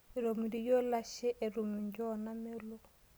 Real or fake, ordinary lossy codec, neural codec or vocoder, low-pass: real; none; none; none